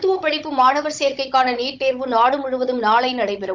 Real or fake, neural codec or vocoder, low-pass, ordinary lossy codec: fake; codec, 16 kHz, 16 kbps, FunCodec, trained on Chinese and English, 50 frames a second; 7.2 kHz; Opus, 32 kbps